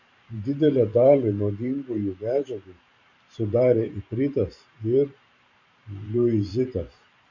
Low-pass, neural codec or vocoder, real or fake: 7.2 kHz; vocoder, 44.1 kHz, 128 mel bands every 512 samples, BigVGAN v2; fake